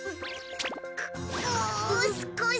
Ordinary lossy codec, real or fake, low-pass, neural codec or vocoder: none; real; none; none